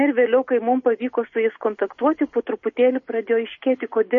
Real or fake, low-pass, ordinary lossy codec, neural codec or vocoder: real; 7.2 kHz; MP3, 32 kbps; none